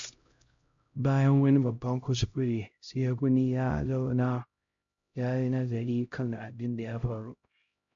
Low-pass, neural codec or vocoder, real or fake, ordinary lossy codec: 7.2 kHz; codec, 16 kHz, 0.5 kbps, X-Codec, HuBERT features, trained on LibriSpeech; fake; MP3, 48 kbps